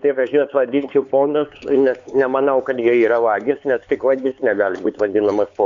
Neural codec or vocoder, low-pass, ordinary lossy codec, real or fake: codec, 16 kHz, 4 kbps, X-Codec, HuBERT features, trained on LibriSpeech; 7.2 kHz; MP3, 64 kbps; fake